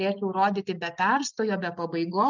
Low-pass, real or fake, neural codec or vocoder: 7.2 kHz; real; none